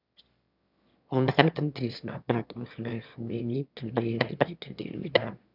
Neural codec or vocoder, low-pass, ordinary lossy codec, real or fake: autoencoder, 22.05 kHz, a latent of 192 numbers a frame, VITS, trained on one speaker; 5.4 kHz; none; fake